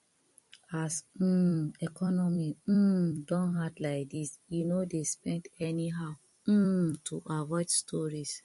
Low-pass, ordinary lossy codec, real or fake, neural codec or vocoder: 14.4 kHz; MP3, 48 kbps; fake; vocoder, 44.1 kHz, 128 mel bands every 256 samples, BigVGAN v2